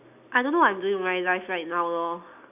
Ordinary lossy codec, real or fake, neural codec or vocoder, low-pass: none; real; none; 3.6 kHz